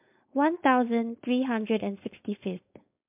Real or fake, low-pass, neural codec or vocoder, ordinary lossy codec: fake; 3.6 kHz; codec, 16 kHz, 4.8 kbps, FACodec; MP3, 32 kbps